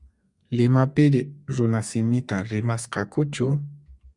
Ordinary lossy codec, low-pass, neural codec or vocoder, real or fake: Opus, 64 kbps; 10.8 kHz; codec, 32 kHz, 1.9 kbps, SNAC; fake